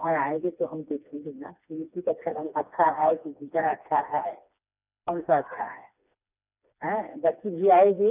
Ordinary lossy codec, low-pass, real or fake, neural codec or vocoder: none; 3.6 kHz; fake; codec, 16 kHz, 2 kbps, FreqCodec, smaller model